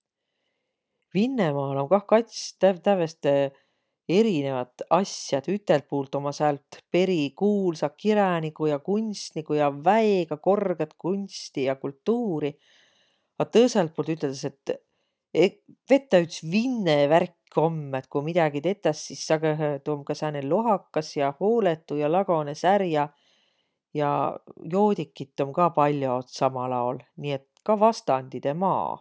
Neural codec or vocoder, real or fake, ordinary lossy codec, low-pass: none; real; none; none